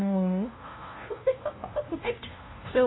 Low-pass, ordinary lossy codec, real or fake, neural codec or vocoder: 7.2 kHz; AAC, 16 kbps; fake; codec, 16 kHz, 0.5 kbps, FunCodec, trained on LibriTTS, 25 frames a second